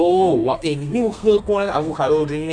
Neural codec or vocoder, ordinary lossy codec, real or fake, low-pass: codec, 32 kHz, 1.9 kbps, SNAC; none; fake; 9.9 kHz